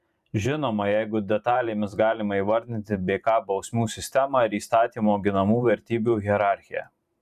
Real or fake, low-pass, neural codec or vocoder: fake; 14.4 kHz; vocoder, 44.1 kHz, 128 mel bands every 512 samples, BigVGAN v2